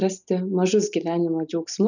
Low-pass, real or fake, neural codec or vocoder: 7.2 kHz; real; none